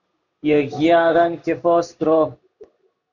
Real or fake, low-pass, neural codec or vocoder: fake; 7.2 kHz; codec, 16 kHz in and 24 kHz out, 1 kbps, XY-Tokenizer